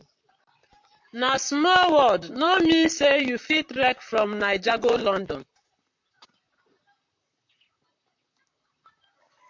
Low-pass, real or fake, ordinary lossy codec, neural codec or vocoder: 7.2 kHz; fake; MP3, 48 kbps; vocoder, 44.1 kHz, 128 mel bands, Pupu-Vocoder